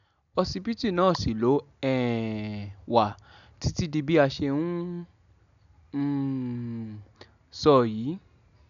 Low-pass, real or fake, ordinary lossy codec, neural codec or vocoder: 7.2 kHz; real; none; none